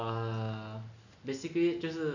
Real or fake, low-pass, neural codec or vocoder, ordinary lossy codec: real; 7.2 kHz; none; none